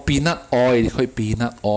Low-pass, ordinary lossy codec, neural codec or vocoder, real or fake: none; none; none; real